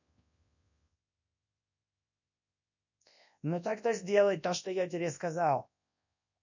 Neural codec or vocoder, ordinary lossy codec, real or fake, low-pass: codec, 24 kHz, 0.9 kbps, WavTokenizer, large speech release; none; fake; 7.2 kHz